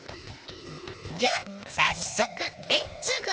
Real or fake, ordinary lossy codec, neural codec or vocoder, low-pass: fake; none; codec, 16 kHz, 0.8 kbps, ZipCodec; none